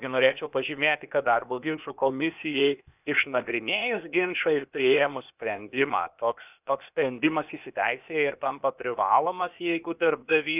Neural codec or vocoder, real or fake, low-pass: codec, 16 kHz, 0.8 kbps, ZipCodec; fake; 3.6 kHz